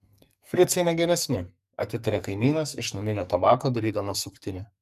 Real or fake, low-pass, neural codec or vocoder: fake; 14.4 kHz; codec, 44.1 kHz, 2.6 kbps, SNAC